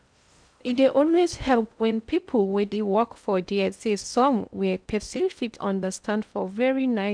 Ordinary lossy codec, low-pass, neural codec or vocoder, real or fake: none; 9.9 kHz; codec, 16 kHz in and 24 kHz out, 0.6 kbps, FocalCodec, streaming, 2048 codes; fake